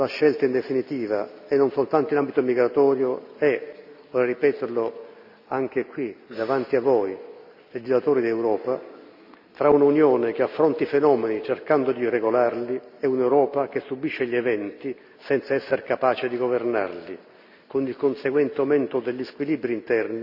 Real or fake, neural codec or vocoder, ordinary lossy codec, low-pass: real; none; none; 5.4 kHz